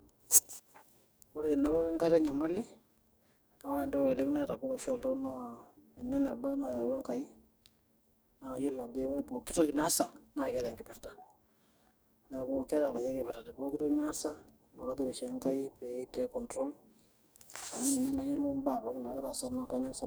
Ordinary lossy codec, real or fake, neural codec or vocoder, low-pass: none; fake; codec, 44.1 kHz, 2.6 kbps, DAC; none